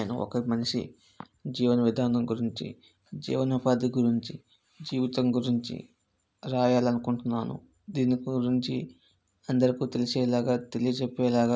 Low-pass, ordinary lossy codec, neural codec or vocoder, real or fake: none; none; none; real